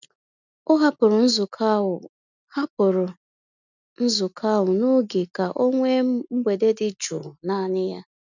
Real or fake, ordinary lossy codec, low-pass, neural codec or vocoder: real; none; 7.2 kHz; none